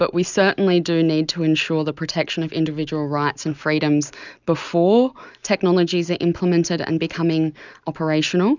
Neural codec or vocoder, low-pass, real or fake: none; 7.2 kHz; real